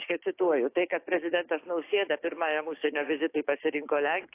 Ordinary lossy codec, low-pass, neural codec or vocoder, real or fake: AAC, 24 kbps; 3.6 kHz; codec, 16 kHz, 6 kbps, DAC; fake